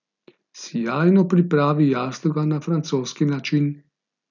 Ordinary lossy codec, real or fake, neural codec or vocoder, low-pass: none; real; none; 7.2 kHz